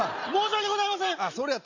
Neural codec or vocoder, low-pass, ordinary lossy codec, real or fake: none; 7.2 kHz; none; real